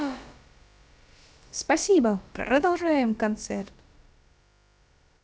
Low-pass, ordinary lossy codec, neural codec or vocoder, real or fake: none; none; codec, 16 kHz, about 1 kbps, DyCAST, with the encoder's durations; fake